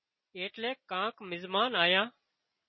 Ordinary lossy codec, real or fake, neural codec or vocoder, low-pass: MP3, 24 kbps; real; none; 7.2 kHz